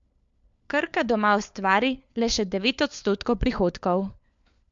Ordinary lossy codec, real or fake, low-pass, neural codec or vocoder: MP3, 64 kbps; fake; 7.2 kHz; codec, 16 kHz, 4 kbps, FunCodec, trained on LibriTTS, 50 frames a second